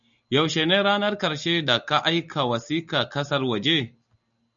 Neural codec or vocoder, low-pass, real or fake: none; 7.2 kHz; real